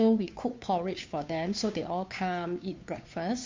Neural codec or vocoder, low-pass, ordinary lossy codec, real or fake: codec, 16 kHz, 2 kbps, FunCodec, trained on Chinese and English, 25 frames a second; 7.2 kHz; MP3, 48 kbps; fake